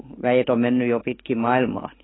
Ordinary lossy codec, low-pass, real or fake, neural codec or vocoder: AAC, 16 kbps; 7.2 kHz; fake; codec, 44.1 kHz, 7.8 kbps, DAC